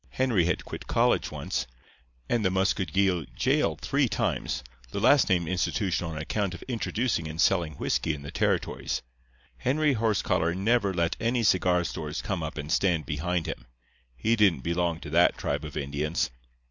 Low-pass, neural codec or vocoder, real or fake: 7.2 kHz; none; real